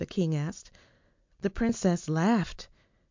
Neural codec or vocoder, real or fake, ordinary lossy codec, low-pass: none; real; MP3, 64 kbps; 7.2 kHz